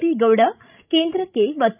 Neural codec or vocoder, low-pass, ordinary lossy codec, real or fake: codec, 16 kHz, 16 kbps, FreqCodec, larger model; 3.6 kHz; none; fake